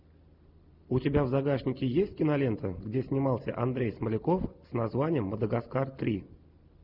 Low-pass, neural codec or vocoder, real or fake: 5.4 kHz; none; real